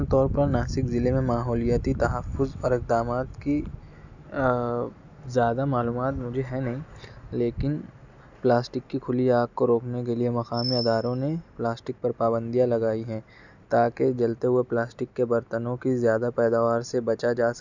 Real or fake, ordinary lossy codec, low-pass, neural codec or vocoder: real; none; 7.2 kHz; none